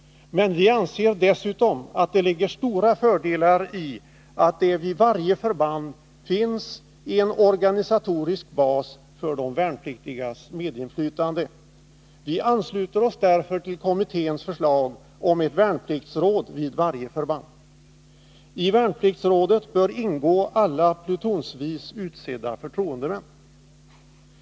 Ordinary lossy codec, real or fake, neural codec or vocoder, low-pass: none; real; none; none